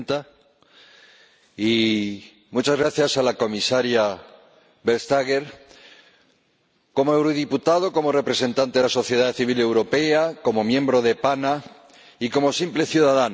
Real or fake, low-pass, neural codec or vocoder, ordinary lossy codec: real; none; none; none